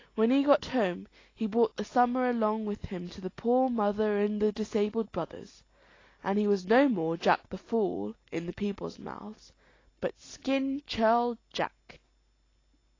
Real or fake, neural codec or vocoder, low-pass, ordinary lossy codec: real; none; 7.2 kHz; AAC, 32 kbps